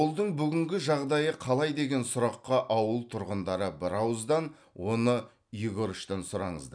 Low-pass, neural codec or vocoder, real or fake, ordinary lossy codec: 9.9 kHz; none; real; none